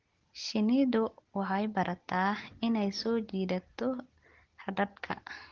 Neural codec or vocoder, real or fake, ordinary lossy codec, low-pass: none; real; Opus, 16 kbps; 7.2 kHz